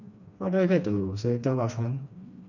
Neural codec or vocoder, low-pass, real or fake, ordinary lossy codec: codec, 16 kHz, 2 kbps, FreqCodec, smaller model; 7.2 kHz; fake; none